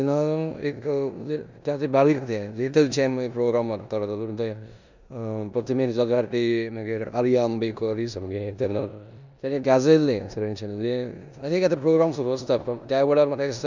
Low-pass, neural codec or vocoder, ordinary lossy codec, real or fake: 7.2 kHz; codec, 16 kHz in and 24 kHz out, 0.9 kbps, LongCat-Audio-Codec, four codebook decoder; none; fake